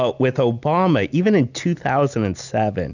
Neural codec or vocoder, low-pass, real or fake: none; 7.2 kHz; real